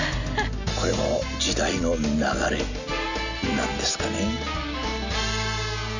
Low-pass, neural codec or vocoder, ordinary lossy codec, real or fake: 7.2 kHz; none; none; real